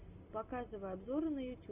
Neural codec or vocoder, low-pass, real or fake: none; 3.6 kHz; real